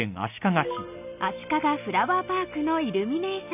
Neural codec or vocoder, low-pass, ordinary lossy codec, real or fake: none; 3.6 kHz; none; real